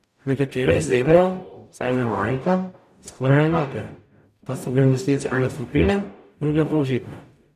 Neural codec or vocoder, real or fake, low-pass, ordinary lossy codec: codec, 44.1 kHz, 0.9 kbps, DAC; fake; 14.4 kHz; none